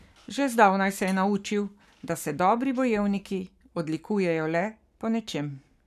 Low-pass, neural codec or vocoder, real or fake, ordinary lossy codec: 14.4 kHz; codec, 44.1 kHz, 7.8 kbps, Pupu-Codec; fake; none